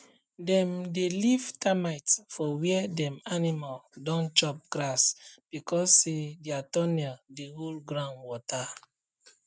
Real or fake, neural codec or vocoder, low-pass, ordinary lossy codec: real; none; none; none